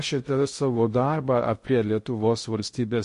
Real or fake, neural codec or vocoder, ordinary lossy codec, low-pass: fake; codec, 16 kHz in and 24 kHz out, 0.6 kbps, FocalCodec, streaming, 2048 codes; MP3, 48 kbps; 10.8 kHz